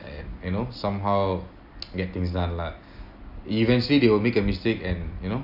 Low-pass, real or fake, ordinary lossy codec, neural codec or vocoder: 5.4 kHz; real; none; none